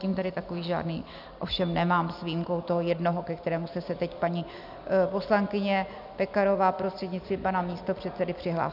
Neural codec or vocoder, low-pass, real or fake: none; 5.4 kHz; real